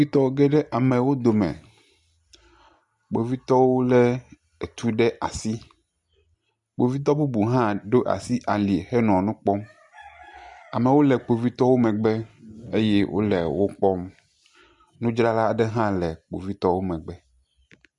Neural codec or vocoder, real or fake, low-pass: none; real; 10.8 kHz